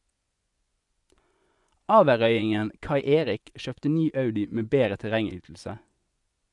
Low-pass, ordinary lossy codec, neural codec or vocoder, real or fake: 10.8 kHz; none; vocoder, 24 kHz, 100 mel bands, Vocos; fake